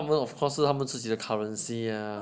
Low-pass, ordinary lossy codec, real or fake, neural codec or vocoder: none; none; real; none